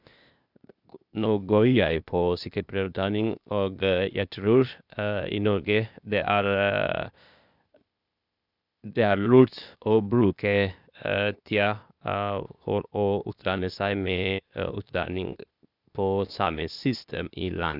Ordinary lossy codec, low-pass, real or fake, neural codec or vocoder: none; 5.4 kHz; fake; codec, 16 kHz, 0.8 kbps, ZipCodec